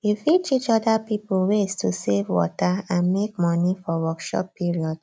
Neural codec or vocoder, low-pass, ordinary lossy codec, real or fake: none; none; none; real